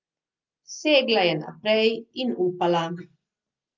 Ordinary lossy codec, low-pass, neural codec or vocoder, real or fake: Opus, 24 kbps; 7.2 kHz; none; real